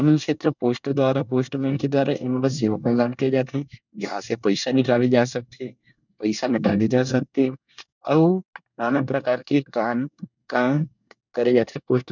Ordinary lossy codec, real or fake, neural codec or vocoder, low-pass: none; fake; codec, 24 kHz, 1 kbps, SNAC; 7.2 kHz